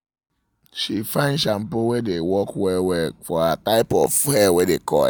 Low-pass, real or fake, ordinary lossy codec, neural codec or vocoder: none; real; none; none